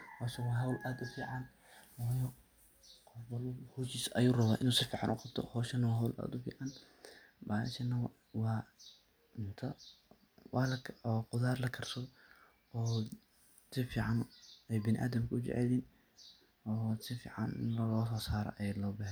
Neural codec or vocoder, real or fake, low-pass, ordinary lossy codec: none; real; none; none